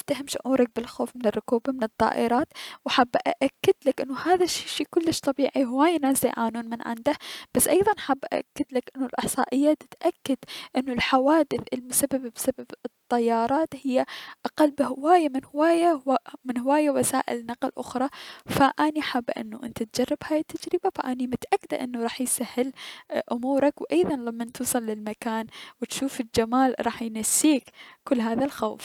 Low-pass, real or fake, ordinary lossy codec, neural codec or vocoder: 19.8 kHz; real; none; none